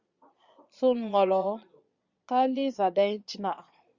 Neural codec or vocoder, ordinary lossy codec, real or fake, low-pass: vocoder, 22.05 kHz, 80 mel bands, Vocos; Opus, 64 kbps; fake; 7.2 kHz